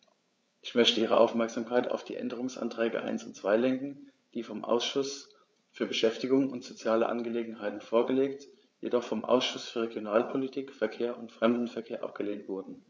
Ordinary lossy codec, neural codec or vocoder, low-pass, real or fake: none; codec, 16 kHz, 8 kbps, FreqCodec, larger model; none; fake